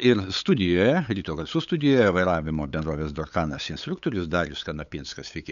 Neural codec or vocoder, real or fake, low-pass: codec, 16 kHz, 8 kbps, FunCodec, trained on LibriTTS, 25 frames a second; fake; 7.2 kHz